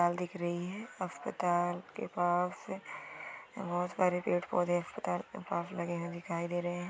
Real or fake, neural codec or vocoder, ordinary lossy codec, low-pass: real; none; none; none